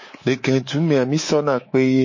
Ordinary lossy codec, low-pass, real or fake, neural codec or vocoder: MP3, 32 kbps; 7.2 kHz; fake; codec, 24 kHz, 3.1 kbps, DualCodec